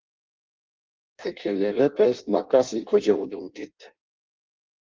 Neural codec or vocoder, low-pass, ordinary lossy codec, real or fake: codec, 16 kHz in and 24 kHz out, 0.6 kbps, FireRedTTS-2 codec; 7.2 kHz; Opus, 24 kbps; fake